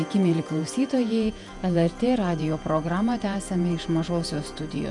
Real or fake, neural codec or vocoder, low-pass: fake; vocoder, 48 kHz, 128 mel bands, Vocos; 10.8 kHz